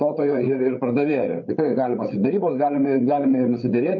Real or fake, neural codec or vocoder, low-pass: fake; codec, 16 kHz, 8 kbps, FreqCodec, larger model; 7.2 kHz